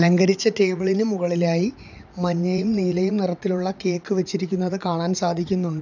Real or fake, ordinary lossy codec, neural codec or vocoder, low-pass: fake; none; vocoder, 44.1 kHz, 80 mel bands, Vocos; 7.2 kHz